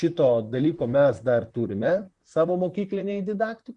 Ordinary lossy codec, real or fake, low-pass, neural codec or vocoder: Opus, 64 kbps; fake; 10.8 kHz; vocoder, 44.1 kHz, 128 mel bands, Pupu-Vocoder